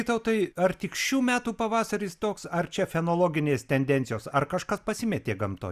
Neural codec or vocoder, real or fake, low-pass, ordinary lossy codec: none; real; 14.4 kHz; Opus, 64 kbps